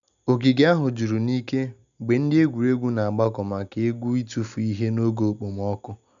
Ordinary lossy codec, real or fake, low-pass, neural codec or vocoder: none; real; 7.2 kHz; none